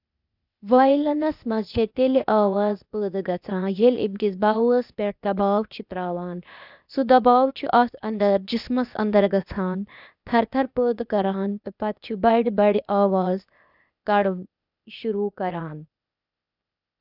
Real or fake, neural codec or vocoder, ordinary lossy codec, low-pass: fake; codec, 16 kHz, 0.8 kbps, ZipCodec; none; 5.4 kHz